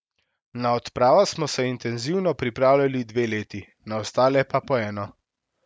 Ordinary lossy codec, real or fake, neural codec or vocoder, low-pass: none; real; none; none